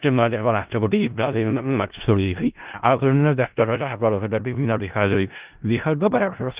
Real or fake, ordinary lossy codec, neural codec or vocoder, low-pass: fake; Opus, 32 kbps; codec, 16 kHz in and 24 kHz out, 0.4 kbps, LongCat-Audio-Codec, four codebook decoder; 3.6 kHz